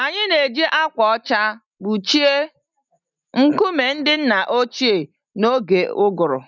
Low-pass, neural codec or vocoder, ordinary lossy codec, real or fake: 7.2 kHz; none; none; real